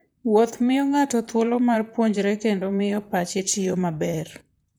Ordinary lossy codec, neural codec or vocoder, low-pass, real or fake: none; vocoder, 44.1 kHz, 128 mel bands, Pupu-Vocoder; none; fake